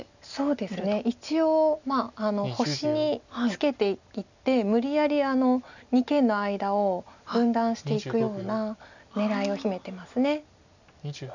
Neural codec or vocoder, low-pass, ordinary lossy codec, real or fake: none; 7.2 kHz; none; real